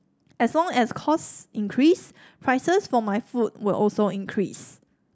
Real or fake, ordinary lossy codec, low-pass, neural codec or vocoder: real; none; none; none